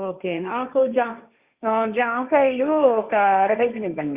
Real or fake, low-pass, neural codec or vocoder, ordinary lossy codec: fake; 3.6 kHz; codec, 16 kHz, 1.1 kbps, Voila-Tokenizer; Opus, 64 kbps